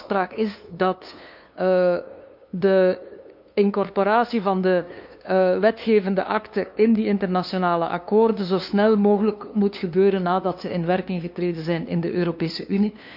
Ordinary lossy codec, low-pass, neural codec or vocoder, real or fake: none; 5.4 kHz; codec, 16 kHz, 2 kbps, FunCodec, trained on LibriTTS, 25 frames a second; fake